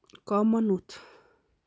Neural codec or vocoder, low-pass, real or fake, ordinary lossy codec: none; none; real; none